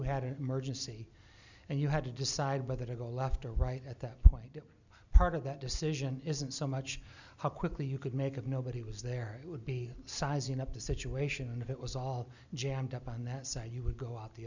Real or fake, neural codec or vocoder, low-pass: real; none; 7.2 kHz